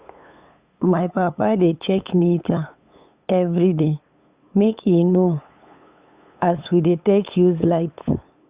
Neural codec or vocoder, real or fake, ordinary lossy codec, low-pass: codec, 16 kHz, 8 kbps, FunCodec, trained on LibriTTS, 25 frames a second; fake; Opus, 64 kbps; 3.6 kHz